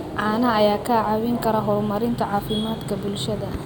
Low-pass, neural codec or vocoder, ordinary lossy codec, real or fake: none; none; none; real